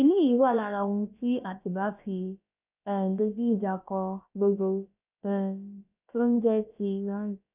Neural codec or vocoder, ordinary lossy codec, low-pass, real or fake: codec, 16 kHz, about 1 kbps, DyCAST, with the encoder's durations; none; 3.6 kHz; fake